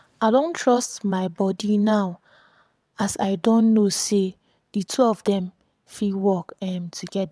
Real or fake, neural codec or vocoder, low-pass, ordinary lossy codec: fake; vocoder, 22.05 kHz, 80 mel bands, WaveNeXt; none; none